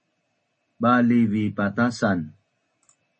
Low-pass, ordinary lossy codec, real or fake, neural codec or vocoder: 10.8 kHz; MP3, 32 kbps; real; none